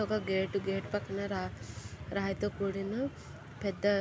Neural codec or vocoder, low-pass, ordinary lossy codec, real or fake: none; none; none; real